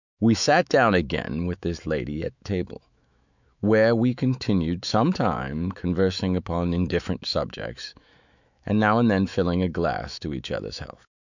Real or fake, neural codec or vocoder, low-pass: fake; autoencoder, 48 kHz, 128 numbers a frame, DAC-VAE, trained on Japanese speech; 7.2 kHz